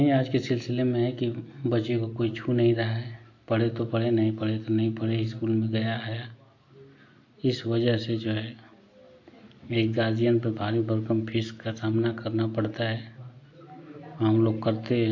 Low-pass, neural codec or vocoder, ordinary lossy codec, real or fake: 7.2 kHz; none; AAC, 48 kbps; real